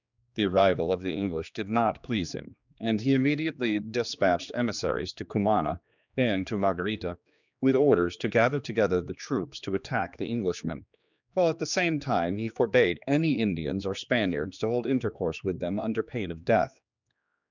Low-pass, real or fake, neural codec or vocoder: 7.2 kHz; fake; codec, 16 kHz, 2 kbps, X-Codec, HuBERT features, trained on general audio